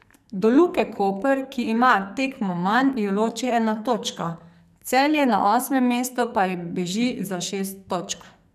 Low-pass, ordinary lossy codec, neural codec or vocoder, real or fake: 14.4 kHz; none; codec, 44.1 kHz, 2.6 kbps, SNAC; fake